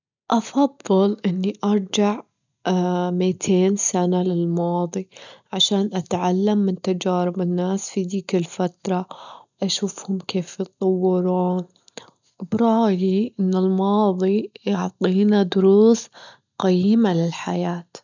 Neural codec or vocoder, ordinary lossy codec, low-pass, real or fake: none; none; 7.2 kHz; real